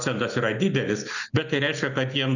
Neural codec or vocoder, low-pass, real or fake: none; 7.2 kHz; real